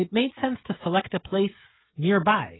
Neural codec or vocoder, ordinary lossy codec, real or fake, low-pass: codec, 16 kHz, 16 kbps, FreqCodec, smaller model; AAC, 16 kbps; fake; 7.2 kHz